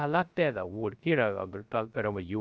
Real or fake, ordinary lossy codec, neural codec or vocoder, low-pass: fake; none; codec, 16 kHz, 0.3 kbps, FocalCodec; none